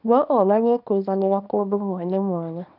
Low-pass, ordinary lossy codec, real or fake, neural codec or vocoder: 5.4 kHz; none; fake; codec, 24 kHz, 0.9 kbps, WavTokenizer, small release